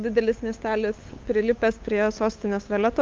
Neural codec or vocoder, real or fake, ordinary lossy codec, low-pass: codec, 16 kHz, 8 kbps, FunCodec, trained on LibriTTS, 25 frames a second; fake; Opus, 32 kbps; 7.2 kHz